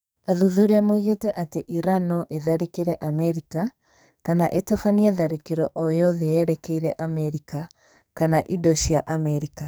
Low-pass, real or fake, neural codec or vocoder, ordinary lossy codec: none; fake; codec, 44.1 kHz, 2.6 kbps, SNAC; none